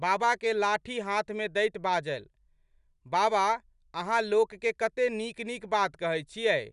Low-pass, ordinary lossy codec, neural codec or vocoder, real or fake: 10.8 kHz; none; none; real